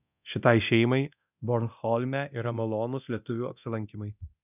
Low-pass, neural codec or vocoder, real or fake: 3.6 kHz; codec, 24 kHz, 0.9 kbps, DualCodec; fake